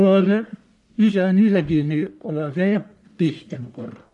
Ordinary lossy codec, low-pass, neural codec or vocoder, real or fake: none; 10.8 kHz; codec, 44.1 kHz, 1.7 kbps, Pupu-Codec; fake